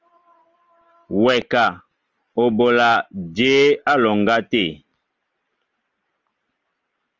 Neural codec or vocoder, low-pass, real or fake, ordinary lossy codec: none; 7.2 kHz; real; Opus, 24 kbps